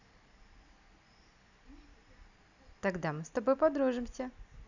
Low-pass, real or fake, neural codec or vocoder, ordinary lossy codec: 7.2 kHz; real; none; none